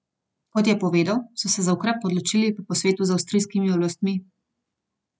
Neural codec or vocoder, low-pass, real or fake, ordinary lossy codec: none; none; real; none